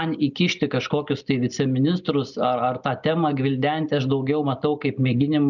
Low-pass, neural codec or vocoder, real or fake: 7.2 kHz; none; real